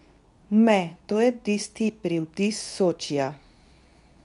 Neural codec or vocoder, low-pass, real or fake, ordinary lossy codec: codec, 24 kHz, 0.9 kbps, WavTokenizer, medium speech release version 2; 10.8 kHz; fake; none